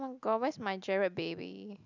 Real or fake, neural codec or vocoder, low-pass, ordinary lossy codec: real; none; 7.2 kHz; none